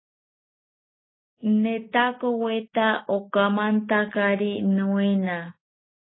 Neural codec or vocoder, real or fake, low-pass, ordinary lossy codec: none; real; 7.2 kHz; AAC, 16 kbps